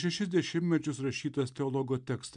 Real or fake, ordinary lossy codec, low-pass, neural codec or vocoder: real; MP3, 96 kbps; 9.9 kHz; none